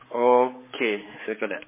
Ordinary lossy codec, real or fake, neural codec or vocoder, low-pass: MP3, 16 kbps; fake; codec, 16 kHz, 4 kbps, X-Codec, HuBERT features, trained on balanced general audio; 3.6 kHz